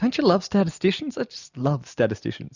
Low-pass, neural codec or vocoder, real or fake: 7.2 kHz; none; real